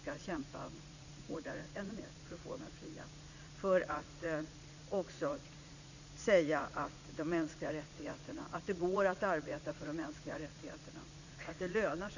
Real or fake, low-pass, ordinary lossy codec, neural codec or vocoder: fake; 7.2 kHz; none; vocoder, 44.1 kHz, 80 mel bands, Vocos